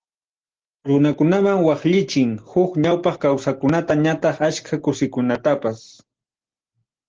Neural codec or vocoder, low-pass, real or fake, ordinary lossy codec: none; 7.2 kHz; real; Opus, 16 kbps